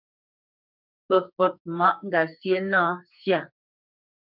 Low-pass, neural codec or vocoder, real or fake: 5.4 kHz; codec, 44.1 kHz, 2.6 kbps, SNAC; fake